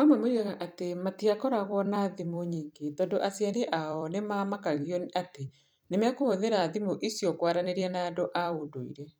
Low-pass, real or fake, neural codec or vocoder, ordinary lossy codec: none; fake; vocoder, 44.1 kHz, 128 mel bands every 256 samples, BigVGAN v2; none